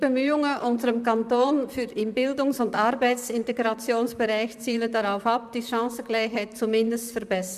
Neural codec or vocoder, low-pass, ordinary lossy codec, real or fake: vocoder, 44.1 kHz, 128 mel bands, Pupu-Vocoder; 14.4 kHz; none; fake